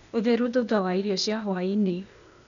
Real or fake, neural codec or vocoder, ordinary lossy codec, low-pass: fake; codec, 16 kHz, 0.8 kbps, ZipCodec; none; 7.2 kHz